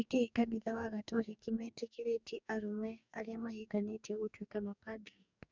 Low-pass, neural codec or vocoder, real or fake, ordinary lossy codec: 7.2 kHz; codec, 44.1 kHz, 2.6 kbps, DAC; fake; none